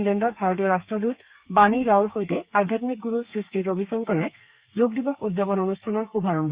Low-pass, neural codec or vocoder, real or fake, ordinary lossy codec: 3.6 kHz; codec, 32 kHz, 1.9 kbps, SNAC; fake; Opus, 64 kbps